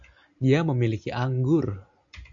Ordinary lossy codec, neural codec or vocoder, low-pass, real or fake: AAC, 64 kbps; none; 7.2 kHz; real